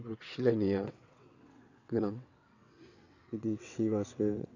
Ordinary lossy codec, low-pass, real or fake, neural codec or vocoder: none; 7.2 kHz; fake; vocoder, 22.05 kHz, 80 mel bands, WaveNeXt